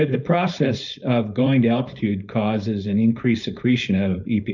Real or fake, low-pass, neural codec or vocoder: fake; 7.2 kHz; codec, 16 kHz, 4.8 kbps, FACodec